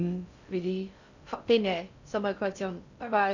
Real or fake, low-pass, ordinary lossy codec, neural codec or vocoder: fake; 7.2 kHz; none; codec, 16 kHz in and 24 kHz out, 0.6 kbps, FocalCodec, streaming, 4096 codes